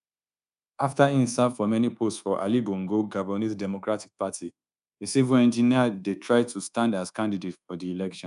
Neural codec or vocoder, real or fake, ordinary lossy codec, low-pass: codec, 24 kHz, 1.2 kbps, DualCodec; fake; none; 10.8 kHz